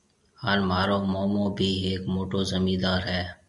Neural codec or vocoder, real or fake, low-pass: none; real; 10.8 kHz